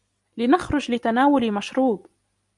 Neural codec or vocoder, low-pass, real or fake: none; 10.8 kHz; real